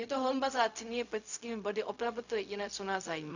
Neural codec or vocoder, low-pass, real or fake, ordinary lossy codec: codec, 16 kHz, 0.4 kbps, LongCat-Audio-Codec; 7.2 kHz; fake; none